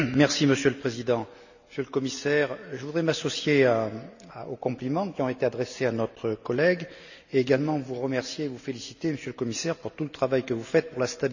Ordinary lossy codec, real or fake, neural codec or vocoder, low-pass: none; real; none; 7.2 kHz